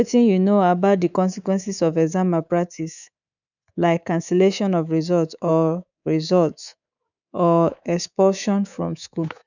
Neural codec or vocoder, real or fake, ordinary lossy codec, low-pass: codec, 24 kHz, 3.1 kbps, DualCodec; fake; none; 7.2 kHz